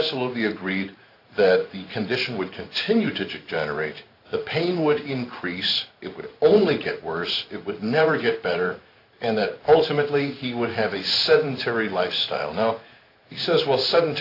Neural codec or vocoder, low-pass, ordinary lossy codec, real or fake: codec, 16 kHz in and 24 kHz out, 1 kbps, XY-Tokenizer; 5.4 kHz; AAC, 32 kbps; fake